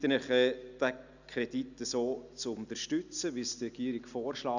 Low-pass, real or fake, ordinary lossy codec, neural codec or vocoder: 7.2 kHz; real; none; none